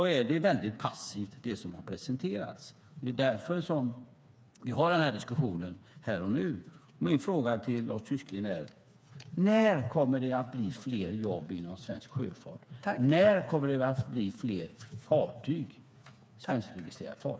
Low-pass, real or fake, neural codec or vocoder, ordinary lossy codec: none; fake; codec, 16 kHz, 4 kbps, FreqCodec, smaller model; none